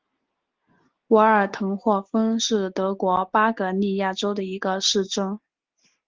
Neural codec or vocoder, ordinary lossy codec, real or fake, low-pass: none; Opus, 16 kbps; real; 7.2 kHz